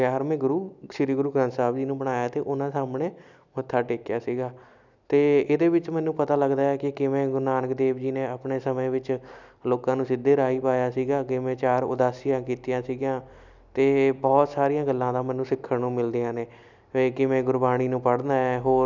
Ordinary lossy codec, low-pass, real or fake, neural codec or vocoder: none; 7.2 kHz; real; none